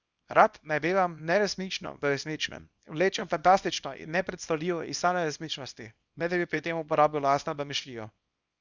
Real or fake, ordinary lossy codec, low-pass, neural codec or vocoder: fake; Opus, 64 kbps; 7.2 kHz; codec, 24 kHz, 0.9 kbps, WavTokenizer, small release